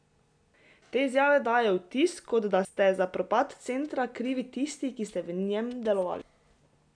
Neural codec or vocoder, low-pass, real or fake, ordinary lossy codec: none; 9.9 kHz; real; none